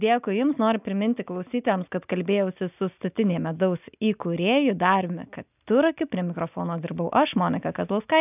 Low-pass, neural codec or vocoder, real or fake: 3.6 kHz; none; real